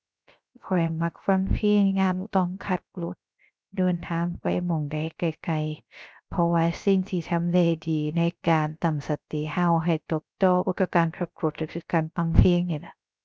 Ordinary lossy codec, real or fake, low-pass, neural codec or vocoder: none; fake; none; codec, 16 kHz, 0.3 kbps, FocalCodec